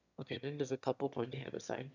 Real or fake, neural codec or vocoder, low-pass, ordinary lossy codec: fake; autoencoder, 22.05 kHz, a latent of 192 numbers a frame, VITS, trained on one speaker; 7.2 kHz; none